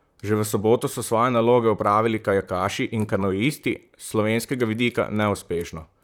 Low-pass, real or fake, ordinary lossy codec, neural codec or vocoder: 19.8 kHz; fake; none; vocoder, 44.1 kHz, 128 mel bands, Pupu-Vocoder